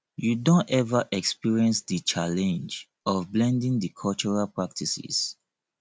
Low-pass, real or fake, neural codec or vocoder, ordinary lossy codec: none; real; none; none